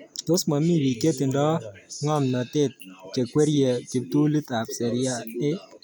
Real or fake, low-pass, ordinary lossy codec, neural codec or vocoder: real; none; none; none